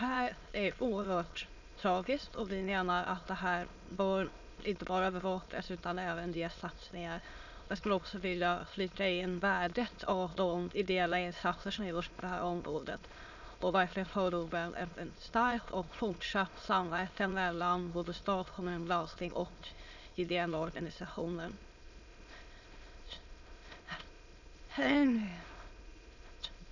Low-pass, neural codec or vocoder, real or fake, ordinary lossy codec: 7.2 kHz; autoencoder, 22.05 kHz, a latent of 192 numbers a frame, VITS, trained on many speakers; fake; none